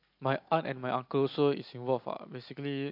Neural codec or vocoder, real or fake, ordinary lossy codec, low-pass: none; real; MP3, 48 kbps; 5.4 kHz